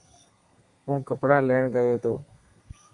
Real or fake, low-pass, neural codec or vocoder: fake; 10.8 kHz; codec, 32 kHz, 1.9 kbps, SNAC